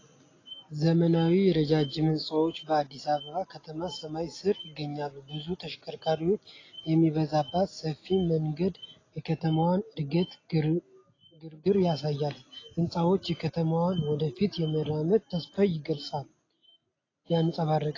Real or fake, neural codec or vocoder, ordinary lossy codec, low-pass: real; none; AAC, 32 kbps; 7.2 kHz